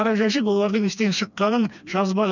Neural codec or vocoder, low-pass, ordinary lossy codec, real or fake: codec, 16 kHz, 2 kbps, FreqCodec, smaller model; 7.2 kHz; none; fake